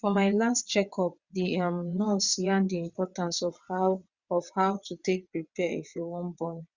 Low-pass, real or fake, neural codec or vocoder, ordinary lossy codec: 7.2 kHz; fake; vocoder, 22.05 kHz, 80 mel bands, WaveNeXt; Opus, 64 kbps